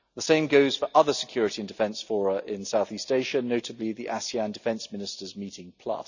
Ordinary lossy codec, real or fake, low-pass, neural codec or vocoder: none; real; 7.2 kHz; none